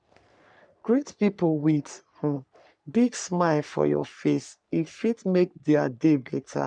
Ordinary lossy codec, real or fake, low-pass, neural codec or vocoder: none; fake; 9.9 kHz; codec, 44.1 kHz, 3.4 kbps, Pupu-Codec